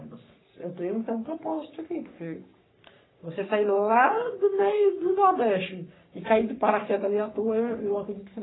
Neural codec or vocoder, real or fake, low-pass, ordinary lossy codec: codec, 44.1 kHz, 3.4 kbps, Pupu-Codec; fake; 7.2 kHz; AAC, 16 kbps